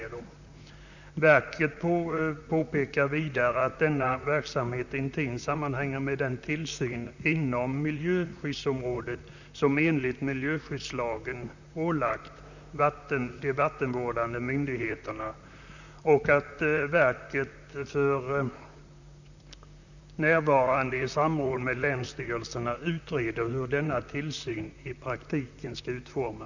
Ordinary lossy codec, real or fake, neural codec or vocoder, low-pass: none; fake; vocoder, 44.1 kHz, 128 mel bands, Pupu-Vocoder; 7.2 kHz